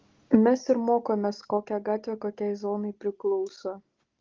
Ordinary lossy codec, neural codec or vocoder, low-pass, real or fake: Opus, 16 kbps; none; 7.2 kHz; real